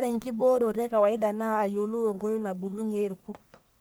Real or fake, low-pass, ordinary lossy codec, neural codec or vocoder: fake; none; none; codec, 44.1 kHz, 1.7 kbps, Pupu-Codec